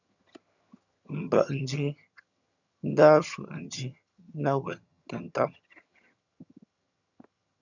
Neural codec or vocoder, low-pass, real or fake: vocoder, 22.05 kHz, 80 mel bands, HiFi-GAN; 7.2 kHz; fake